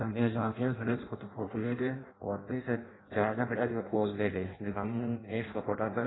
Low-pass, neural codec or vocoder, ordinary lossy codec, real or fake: 7.2 kHz; codec, 16 kHz in and 24 kHz out, 0.6 kbps, FireRedTTS-2 codec; AAC, 16 kbps; fake